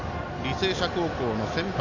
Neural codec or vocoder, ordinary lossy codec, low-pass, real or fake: none; none; 7.2 kHz; real